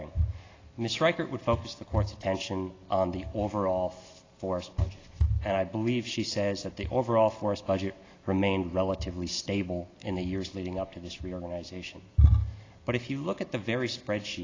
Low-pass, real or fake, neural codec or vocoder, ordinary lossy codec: 7.2 kHz; real; none; AAC, 32 kbps